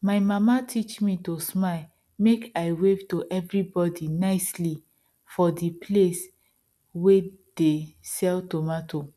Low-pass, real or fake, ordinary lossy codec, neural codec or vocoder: none; real; none; none